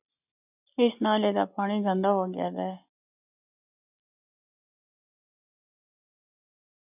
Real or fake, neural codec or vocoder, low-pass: real; none; 3.6 kHz